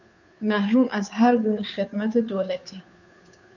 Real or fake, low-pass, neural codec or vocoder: fake; 7.2 kHz; codec, 16 kHz, 2 kbps, FunCodec, trained on Chinese and English, 25 frames a second